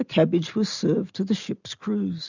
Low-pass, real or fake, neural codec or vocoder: 7.2 kHz; real; none